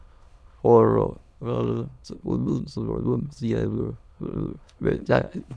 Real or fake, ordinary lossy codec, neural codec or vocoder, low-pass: fake; none; autoencoder, 22.05 kHz, a latent of 192 numbers a frame, VITS, trained on many speakers; none